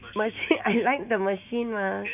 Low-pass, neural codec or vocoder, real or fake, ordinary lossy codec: 3.6 kHz; autoencoder, 48 kHz, 128 numbers a frame, DAC-VAE, trained on Japanese speech; fake; none